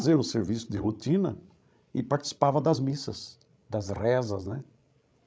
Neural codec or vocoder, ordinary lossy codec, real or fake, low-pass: codec, 16 kHz, 16 kbps, FreqCodec, larger model; none; fake; none